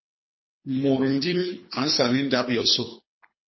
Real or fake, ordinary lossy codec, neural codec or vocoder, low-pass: fake; MP3, 24 kbps; codec, 24 kHz, 3 kbps, HILCodec; 7.2 kHz